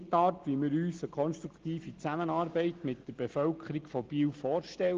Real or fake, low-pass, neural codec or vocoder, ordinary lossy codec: real; 7.2 kHz; none; Opus, 16 kbps